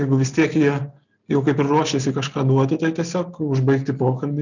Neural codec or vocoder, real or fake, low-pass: none; real; 7.2 kHz